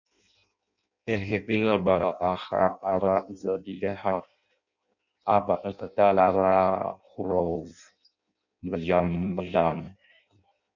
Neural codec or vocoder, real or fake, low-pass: codec, 16 kHz in and 24 kHz out, 0.6 kbps, FireRedTTS-2 codec; fake; 7.2 kHz